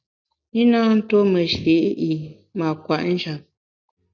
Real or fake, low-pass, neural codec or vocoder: real; 7.2 kHz; none